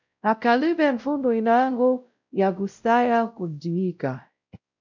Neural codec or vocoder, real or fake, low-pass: codec, 16 kHz, 0.5 kbps, X-Codec, WavLM features, trained on Multilingual LibriSpeech; fake; 7.2 kHz